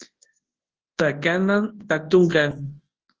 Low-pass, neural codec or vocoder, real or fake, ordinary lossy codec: 7.2 kHz; codec, 24 kHz, 0.9 kbps, WavTokenizer, large speech release; fake; Opus, 16 kbps